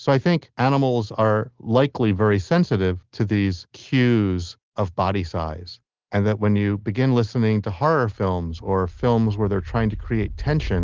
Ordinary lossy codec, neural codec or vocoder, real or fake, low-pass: Opus, 16 kbps; none; real; 7.2 kHz